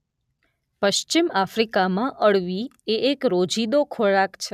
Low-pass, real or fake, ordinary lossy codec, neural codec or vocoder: 14.4 kHz; real; none; none